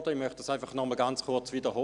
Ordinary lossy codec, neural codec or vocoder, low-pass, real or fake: none; none; 10.8 kHz; real